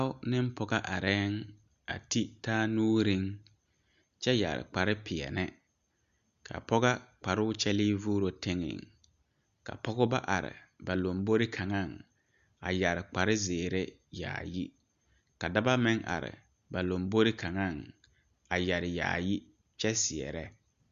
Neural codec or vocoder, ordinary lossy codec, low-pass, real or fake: none; Opus, 64 kbps; 7.2 kHz; real